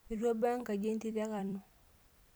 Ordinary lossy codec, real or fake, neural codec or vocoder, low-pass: none; fake; vocoder, 44.1 kHz, 128 mel bands every 256 samples, BigVGAN v2; none